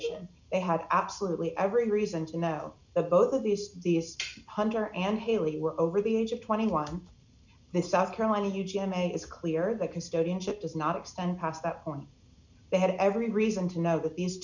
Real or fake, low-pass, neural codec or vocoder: real; 7.2 kHz; none